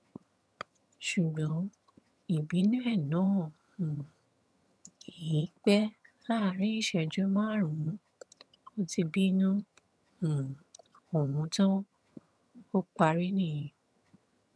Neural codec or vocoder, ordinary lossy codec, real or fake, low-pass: vocoder, 22.05 kHz, 80 mel bands, HiFi-GAN; none; fake; none